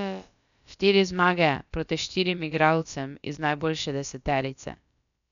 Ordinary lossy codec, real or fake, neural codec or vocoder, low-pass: none; fake; codec, 16 kHz, about 1 kbps, DyCAST, with the encoder's durations; 7.2 kHz